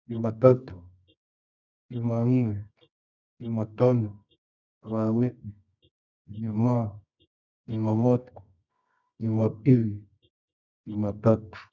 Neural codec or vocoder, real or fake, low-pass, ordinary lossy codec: codec, 24 kHz, 0.9 kbps, WavTokenizer, medium music audio release; fake; 7.2 kHz; none